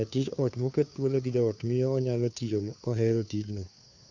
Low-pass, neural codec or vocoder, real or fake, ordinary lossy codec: 7.2 kHz; codec, 16 kHz, 2 kbps, FunCodec, trained on Chinese and English, 25 frames a second; fake; none